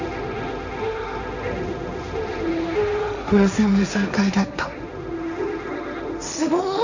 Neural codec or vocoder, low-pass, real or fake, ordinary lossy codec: codec, 16 kHz, 1.1 kbps, Voila-Tokenizer; 7.2 kHz; fake; none